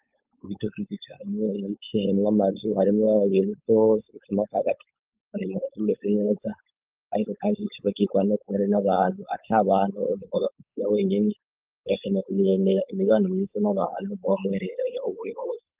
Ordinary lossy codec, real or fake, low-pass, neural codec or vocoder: Opus, 24 kbps; fake; 3.6 kHz; codec, 16 kHz, 4.8 kbps, FACodec